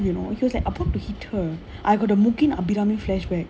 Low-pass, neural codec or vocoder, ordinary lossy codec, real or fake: none; none; none; real